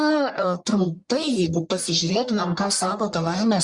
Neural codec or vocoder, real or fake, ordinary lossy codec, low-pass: codec, 44.1 kHz, 1.7 kbps, Pupu-Codec; fake; Opus, 64 kbps; 10.8 kHz